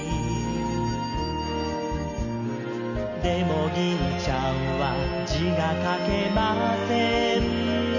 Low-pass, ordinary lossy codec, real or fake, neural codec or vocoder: 7.2 kHz; none; real; none